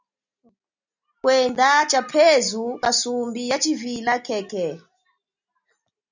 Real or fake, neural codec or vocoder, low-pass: real; none; 7.2 kHz